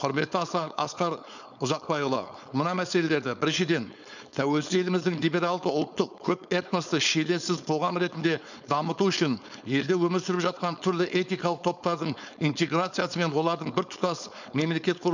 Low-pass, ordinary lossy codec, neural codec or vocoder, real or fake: 7.2 kHz; none; codec, 16 kHz, 4.8 kbps, FACodec; fake